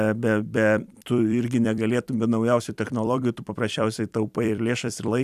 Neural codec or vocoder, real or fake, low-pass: vocoder, 44.1 kHz, 128 mel bands every 256 samples, BigVGAN v2; fake; 14.4 kHz